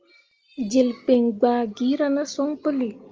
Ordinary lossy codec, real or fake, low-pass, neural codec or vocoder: Opus, 24 kbps; real; 7.2 kHz; none